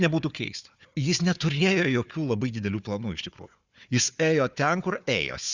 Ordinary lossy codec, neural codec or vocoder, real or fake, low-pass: Opus, 64 kbps; codec, 16 kHz, 4 kbps, FunCodec, trained on Chinese and English, 50 frames a second; fake; 7.2 kHz